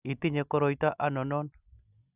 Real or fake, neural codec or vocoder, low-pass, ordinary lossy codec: real; none; 3.6 kHz; none